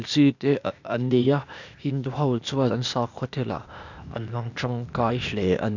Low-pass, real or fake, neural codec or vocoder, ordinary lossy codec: 7.2 kHz; fake; codec, 16 kHz, 0.8 kbps, ZipCodec; none